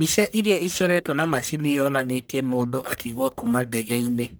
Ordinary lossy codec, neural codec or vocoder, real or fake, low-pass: none; codec, 44.1 kHz, 1.7 kbps, Pupu-Codec; fake; none